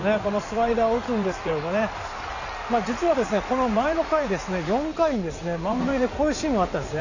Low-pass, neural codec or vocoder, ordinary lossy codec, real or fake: 7.2 kHz; codec, 16 kHz in and 24 kHz out, 2.2 kbps, FireRedTTS-2 codec; none; fake